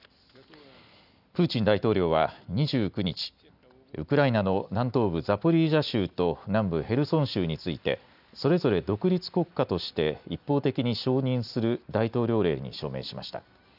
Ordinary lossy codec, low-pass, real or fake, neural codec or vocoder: none; 5.4 kHz; real; none